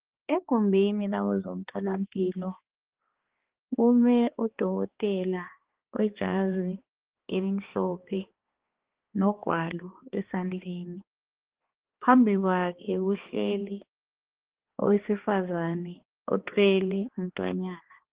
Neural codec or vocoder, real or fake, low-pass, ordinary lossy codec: codec, 16 kHz, 2 kbps, X-Codec, HuBERT features, trained on balanced general audio; fake; 3.6 kHz; Opus, 16 kbps